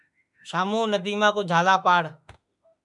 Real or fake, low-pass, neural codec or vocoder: fake; 10.8 kHz; autoencoder, 48 kHz, 32 numbers a frame, DAC-VAE, trained on Japanese speech